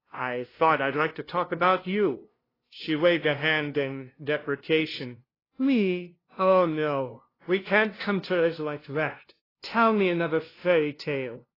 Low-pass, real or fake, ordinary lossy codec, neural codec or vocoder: 5.4 kHz; fake; AAC, 24 kbps; codec, 16 kHz, 0.5 kbps, FunCodec, trained on LibriTTS, 25 frames a second